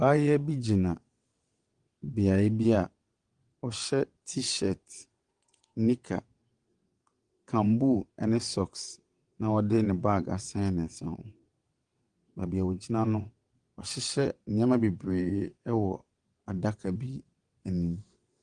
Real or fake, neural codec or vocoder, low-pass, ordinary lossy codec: fake; vocoder, 22.05 kHz, 80 mel bands, WaveNeXt; 9.9 kHz; Opus, 24 kbps